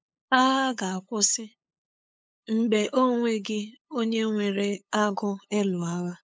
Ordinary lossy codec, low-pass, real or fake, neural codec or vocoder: none; none; fake; codec, 16 kHz, 8 kbps, FunCodec, trained on LibriTTS, 25 frames a second